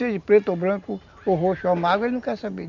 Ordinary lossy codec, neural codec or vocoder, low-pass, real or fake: none; none; 7.2 kHz; real